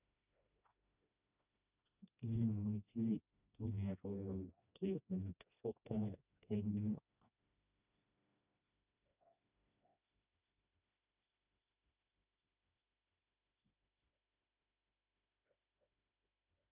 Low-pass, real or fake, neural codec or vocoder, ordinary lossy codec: 3.6 kHz; fake; codec, 16 kHz, 1 kbps, FreqCodec, smaller model; none